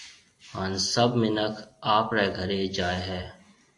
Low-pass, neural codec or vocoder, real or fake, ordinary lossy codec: 10.8 kHz; none; real; AAC, 48 kbps